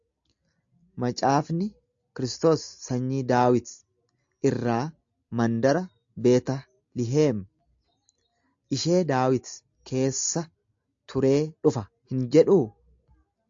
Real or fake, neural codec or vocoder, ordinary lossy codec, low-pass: real; none; AAC, 64 kbps; 7.2 kHz